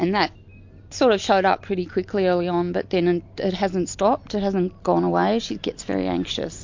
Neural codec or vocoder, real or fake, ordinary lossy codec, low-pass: none; real; MP3, 48 kbps; 7.2 kHz